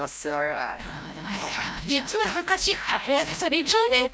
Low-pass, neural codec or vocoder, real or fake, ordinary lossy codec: none; codec, 16 kHz, 0.5 kbps, FreqCodec, larger model; fake; none